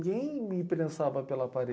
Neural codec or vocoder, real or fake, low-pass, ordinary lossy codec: none; real; none; none